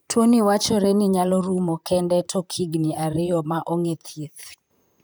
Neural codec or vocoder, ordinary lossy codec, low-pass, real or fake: vocoder, 44.1 kHz, 128 mel bands, Pupu-Vocoder; none; none; fake